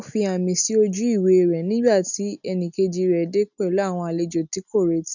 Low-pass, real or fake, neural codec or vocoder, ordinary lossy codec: 7.2 kHz; real; none; none